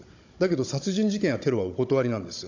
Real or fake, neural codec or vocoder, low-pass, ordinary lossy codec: fake; codec, 16 kHz, 16 kbps, FunCodec, trained on Chinese and English, 50 frames a second; 7.2 kHz; AAC, 48 kbps